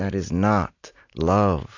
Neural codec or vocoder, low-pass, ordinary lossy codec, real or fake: none; 7.2 kHz; AAC, 48 kbps; real